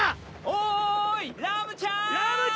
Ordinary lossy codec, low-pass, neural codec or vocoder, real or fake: none; none; none; real